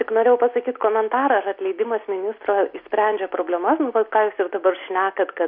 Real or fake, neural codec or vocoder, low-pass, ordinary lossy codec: real; none; 5.4 kHz; MP3, 48 kbps